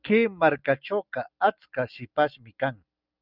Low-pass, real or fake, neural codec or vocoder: 5.4 kHz; real; none